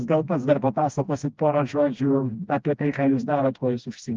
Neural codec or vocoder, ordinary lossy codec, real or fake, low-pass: codec, 16 kHz, 1 kbps, FreqCodec, smaller model; Opus, 32 kbps; fake; 7.2 kHz